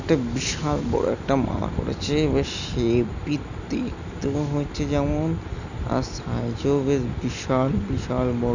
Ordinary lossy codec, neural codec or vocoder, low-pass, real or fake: none; none; 7.2 kHz; real